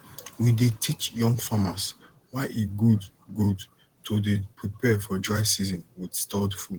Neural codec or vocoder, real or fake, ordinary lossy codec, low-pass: vocoder, 44.1 kHz, 128 mel bands, Pupu-Vocoder; fake; Opus, 16 kbps; 19.8 kHz